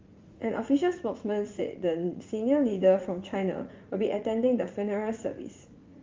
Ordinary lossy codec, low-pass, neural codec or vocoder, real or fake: Opus, 32 kbps; 7.2 kHz; vocoder, 44.1 kHz, 80 mel bands, Vocos; fake